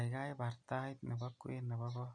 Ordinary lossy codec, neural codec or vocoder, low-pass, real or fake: none; none; 9.9 kHz; real